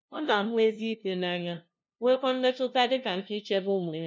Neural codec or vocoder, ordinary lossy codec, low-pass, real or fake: codec, 16 kHz, 0.5 kbps, FunCodec, trained on LibriTTS, 25 frames a second; none; none; fake